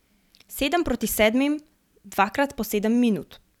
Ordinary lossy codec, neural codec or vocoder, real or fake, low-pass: none; vocoder, 44.1 kHz, 128 mel bands every 256 samples, BigVGAN v2; fake; 19.8 kHz